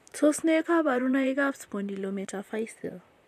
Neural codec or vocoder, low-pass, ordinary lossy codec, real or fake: vocoder, 44.1 kHz, 128 mel bands, Pupu-Vocoder; 14.4 kHz; none; fake